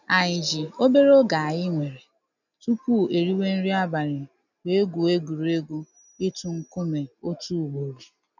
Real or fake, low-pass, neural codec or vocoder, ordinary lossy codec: real; 7.2 kHz; none; none